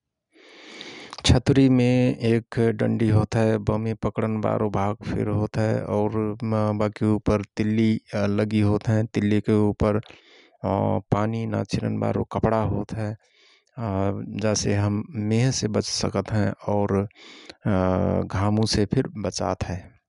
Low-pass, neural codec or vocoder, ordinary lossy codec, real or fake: 10.8 kHz; none; none; real